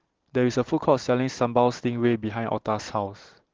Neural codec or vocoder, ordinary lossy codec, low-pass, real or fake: none; Opus, 16 kbps; 7.2 kHz; real